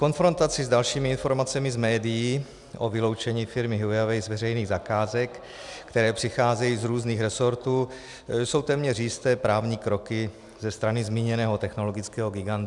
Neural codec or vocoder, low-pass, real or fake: none; 10.8 kHz; real